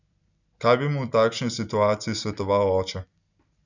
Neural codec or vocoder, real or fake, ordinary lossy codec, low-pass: none; real; none; 7.2 kHz